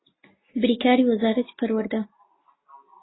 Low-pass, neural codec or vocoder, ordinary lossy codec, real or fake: 7.2 kHz; none; AAC, 16 kbps; real